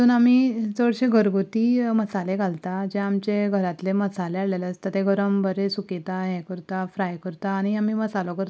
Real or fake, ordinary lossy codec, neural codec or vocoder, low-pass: real; none; none; none